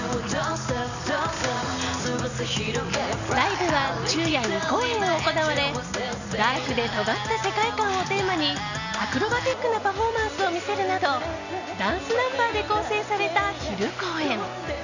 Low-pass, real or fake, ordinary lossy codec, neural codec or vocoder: 7.2 kHz; real; none; none